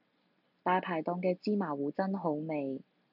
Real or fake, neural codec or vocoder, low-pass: real; none; 5.4 kHz